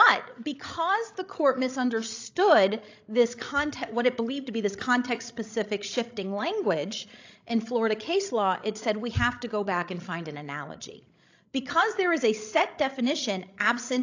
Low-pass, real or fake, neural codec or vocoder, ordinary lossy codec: 7.2 kHz; fake; codec, 16 kHz, 16 kbps, FreqCodec, larger model; AAC, 48 kbps